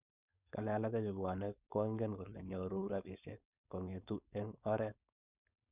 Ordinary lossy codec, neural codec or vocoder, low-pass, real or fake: none; codec, 16 kHz, 4.8 kbps, FACodec; 3.6 kHz; fake